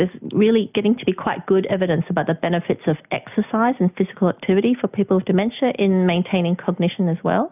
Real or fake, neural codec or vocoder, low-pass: real; none; 3.6 kHz